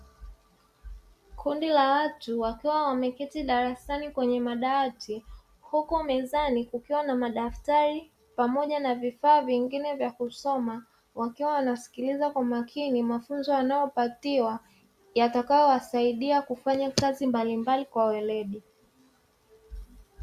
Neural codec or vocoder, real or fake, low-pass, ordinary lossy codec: none; real; 14.4 kHz; Opus, 64 kbps